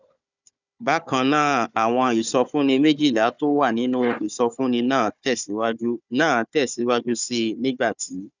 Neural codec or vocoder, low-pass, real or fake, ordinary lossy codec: codec, 16 kHz, 4 kbps, FunCodec, trained on Chinese and English, 50 frames a second; 7.2 kHz; fake; none